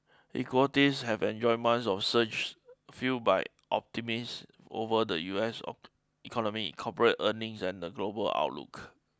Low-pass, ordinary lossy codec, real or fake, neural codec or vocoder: none; none; real; none